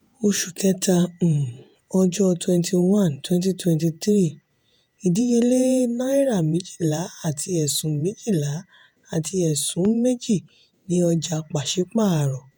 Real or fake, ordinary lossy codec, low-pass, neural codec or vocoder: fake; none; none; vocoder, 48 kHz, 128 mel bands, Vocos